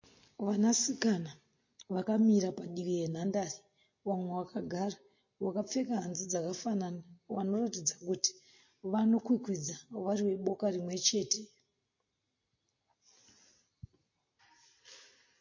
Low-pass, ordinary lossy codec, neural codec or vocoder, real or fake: 7.2 kHz; MP3, 32 kbps; none; real